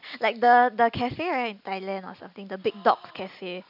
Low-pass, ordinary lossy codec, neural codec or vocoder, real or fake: 5.4 kHz; none; none; real